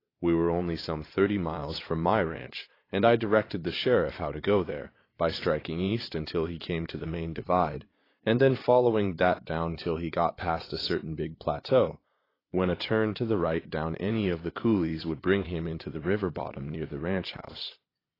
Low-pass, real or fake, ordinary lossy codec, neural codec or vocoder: 5.4 kHz; fake; AAC, 24 kbps; vocoder, 22.05 kHz, 80 mel bands, Vocos